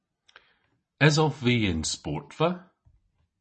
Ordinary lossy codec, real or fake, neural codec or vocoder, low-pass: MP3, 32 kbps; real; none; 10.8 kHz